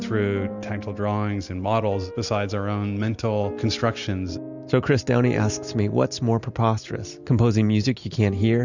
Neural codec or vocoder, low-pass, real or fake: none; 7.2 kHz; real